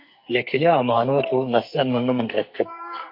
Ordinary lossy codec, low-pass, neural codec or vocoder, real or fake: MP3, 32 kbps; 5.4 kHz; codec, 44.1 kHz, 2.6 kbps, SNAC; fake